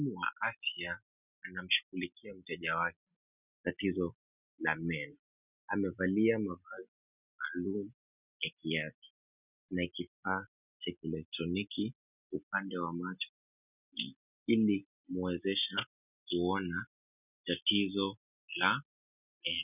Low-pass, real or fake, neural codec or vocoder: 3.6 kHz; real; none